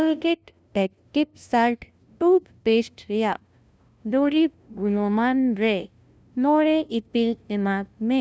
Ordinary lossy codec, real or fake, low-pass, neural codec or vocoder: none; fake; none; codec, 16 kHz, 0.5 kbps, FunCodec, trained on LibriTTS, 25 frames a second